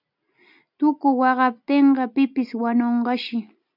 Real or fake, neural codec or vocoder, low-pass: real; none; 5.4 kHz